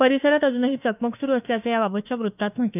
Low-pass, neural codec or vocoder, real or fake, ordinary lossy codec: 3.6 kHz; autoencoder, 48 kHz, 32 numbers a frame, DAC-VAE, trained on Japanese speech; fake; none